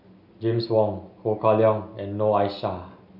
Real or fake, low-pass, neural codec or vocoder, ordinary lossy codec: real; 5.4 kHz; none; none